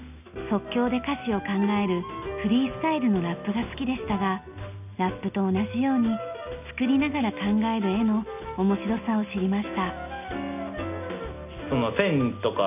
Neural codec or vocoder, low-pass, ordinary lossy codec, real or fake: none; 3.6 kHz; none; real